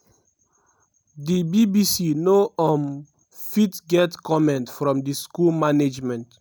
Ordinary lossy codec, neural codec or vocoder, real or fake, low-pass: none; none; real; none